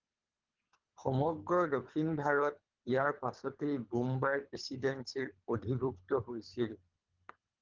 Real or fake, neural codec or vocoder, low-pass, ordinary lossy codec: fake; codec, 24 kHz, 3 kbps, HILCodec; 7.2 kHz; Opus, 32 kbps